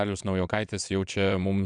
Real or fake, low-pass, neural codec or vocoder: fake; 9.9 kHz; vocoder, 22.05 kHz, 80 mel bands, WaveNeXt